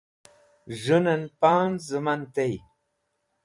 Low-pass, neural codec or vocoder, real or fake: 10.8 kHz; vocoder, 44.1 kHz, 128 mel bands every 256 samples, BigVGAN v2; fake